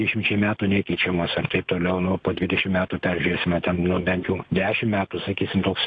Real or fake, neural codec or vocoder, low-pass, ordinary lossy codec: real; none; 9.9 kHz; AAC, 48 kbps